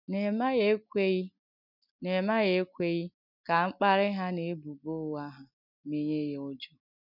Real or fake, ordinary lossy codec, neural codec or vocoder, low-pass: real; none; none; 5.4 kHz